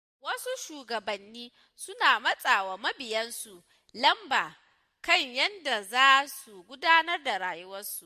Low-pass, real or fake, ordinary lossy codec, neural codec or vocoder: 14.4 kHz; real; MP3, 64 kbps; none